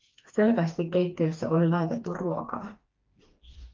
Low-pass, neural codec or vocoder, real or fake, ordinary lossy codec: 7.2 kHz; codec, 16 kHz, 2 kbps, FreqCodec, smaller model; fake; Opus, 32 kbps